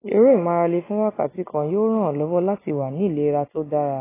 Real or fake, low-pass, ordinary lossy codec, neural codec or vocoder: real; 3.6 kHz; AAC, 16 kbps; none